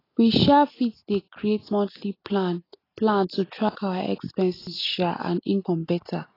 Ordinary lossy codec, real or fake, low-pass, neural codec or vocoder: AAC, 24 kbps; real; 5.4 kHz; none